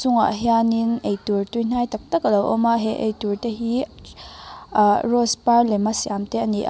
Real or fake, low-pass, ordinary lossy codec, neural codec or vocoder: real; none; none; none